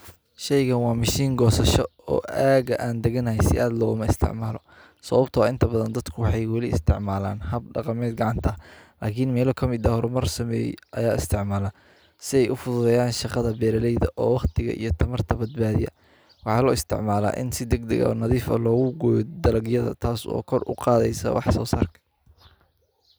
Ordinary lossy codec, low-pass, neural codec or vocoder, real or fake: none; none; none; real